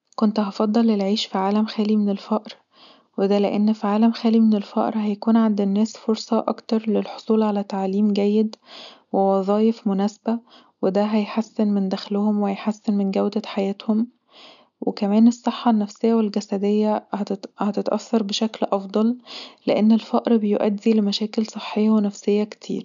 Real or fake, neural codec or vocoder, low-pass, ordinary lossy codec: real; none; 7.2 kHz; none